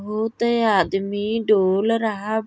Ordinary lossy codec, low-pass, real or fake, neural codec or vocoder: none; none; real; none